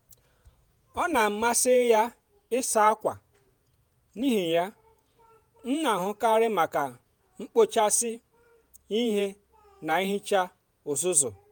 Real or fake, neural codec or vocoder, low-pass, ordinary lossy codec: fake; vocoder, 48 kHz, 128 mel bands, Vocos; none; none